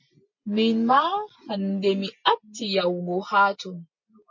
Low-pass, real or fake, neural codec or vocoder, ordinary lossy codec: 7.2 kHz; real; none; MP3, 32 kbps